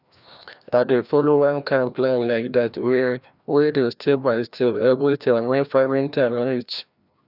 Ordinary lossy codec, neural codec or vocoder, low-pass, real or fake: none; codec, 16 kHz, 1 kbps, FreqCodec, larger model; 5.4 kHz; fake